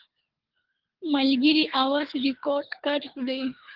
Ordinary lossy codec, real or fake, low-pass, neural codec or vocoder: Opus, 16 kbps; fake; 5.4 kHz; codec, 24 kHz, 3 kbps, HILCodec